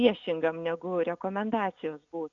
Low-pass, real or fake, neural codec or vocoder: 7.2 kHz; real; none